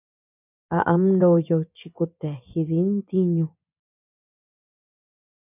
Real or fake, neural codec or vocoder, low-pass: fake; vocoder, 24 kHz, 100 mel bands, Vocos; 3.6 kHz